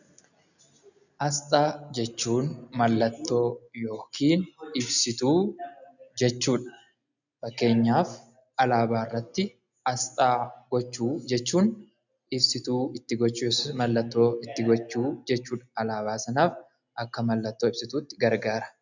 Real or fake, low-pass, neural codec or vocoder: real; 7.2 kHz; none